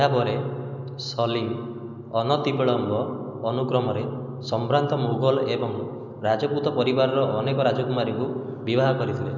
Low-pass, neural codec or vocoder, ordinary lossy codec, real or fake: 7.2 kHz; none; none; real